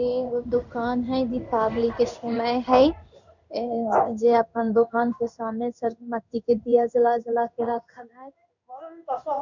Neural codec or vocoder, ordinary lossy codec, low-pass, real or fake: codec, 16 kHz in and 24 kHz out, 1 kbps, XY-Tokenizer; none; 7.2 kHz; fake